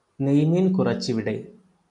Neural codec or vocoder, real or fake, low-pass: none; real; 10.8 kHz